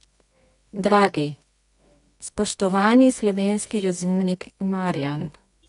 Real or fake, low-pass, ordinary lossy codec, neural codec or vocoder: fake; 10.8 kHz; none; codec, 24 kHz, 0.9 kbps, WavTokenizer, medium music audio release